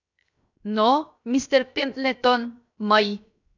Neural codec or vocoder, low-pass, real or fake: codec, 16 kHz, 0.7 kbps, FocalCodec; 7.2 kHz; fake